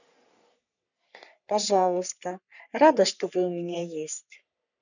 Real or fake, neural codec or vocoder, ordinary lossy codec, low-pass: fake; codec, 44.1 kHz, 3.4 kbps, Pupu-Codec; none; 7.2 kHz